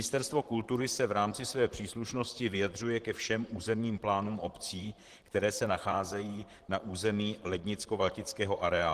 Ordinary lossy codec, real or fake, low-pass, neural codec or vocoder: Opus, 16 kbps; fake; 14.4 kHz; vocoder, 44.1 kHz, 128 mel bands, Pupu-Vocoder